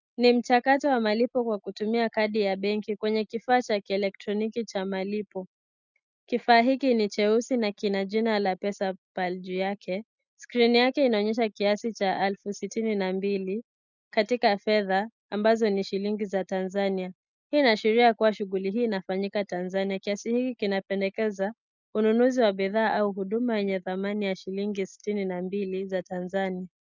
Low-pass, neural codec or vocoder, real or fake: 7.2 kHz; none; real